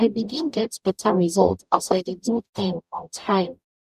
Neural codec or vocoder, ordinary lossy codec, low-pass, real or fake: codec, 44.1 kHz, 0.9 kbps, DAC; none; 14.4 kHz; fake